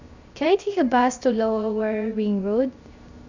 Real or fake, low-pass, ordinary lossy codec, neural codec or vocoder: fake; 7.2 kHz; Opus, 64 kbps; codec, 16 kHz, 0.3 kbps, FocalCodec